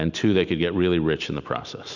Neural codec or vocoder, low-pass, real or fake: none; 7.2 kHz; real